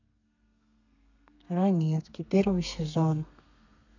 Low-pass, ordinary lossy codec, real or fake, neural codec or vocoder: 7.2 kHz; none; fake; codec, 32 kHz, 1.9 kbps, SNAC